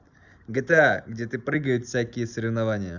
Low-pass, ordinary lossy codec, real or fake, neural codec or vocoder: 7.2 kHz; none; real; none